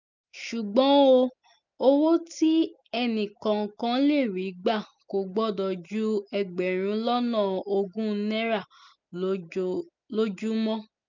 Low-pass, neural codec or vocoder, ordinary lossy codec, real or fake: 7.2 kHz; none; none; real